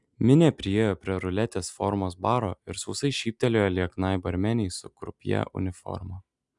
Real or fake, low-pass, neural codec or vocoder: real; 10.8 kHz; none